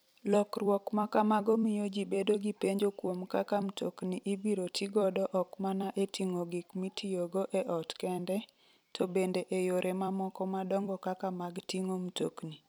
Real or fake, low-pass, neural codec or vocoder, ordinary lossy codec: fake; none; vocoder, 44.1 kHz, 128 mel bands every 256 samples, BigVGAN v2; none